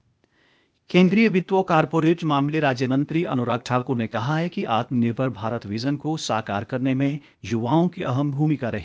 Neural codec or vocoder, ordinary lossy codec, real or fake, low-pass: codec, 16 kHz, 0.8 kbps, ZipCodec; none; fake; none